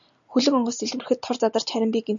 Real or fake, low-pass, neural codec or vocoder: real; 7.2 kHz; none